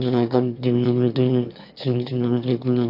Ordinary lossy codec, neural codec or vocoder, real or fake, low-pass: AAC, 48 kbps; autoencoder, 22.05 kHz, a latent of 192 numbers a frame, VITS, trained on one speaker; fake; 5.4 kHz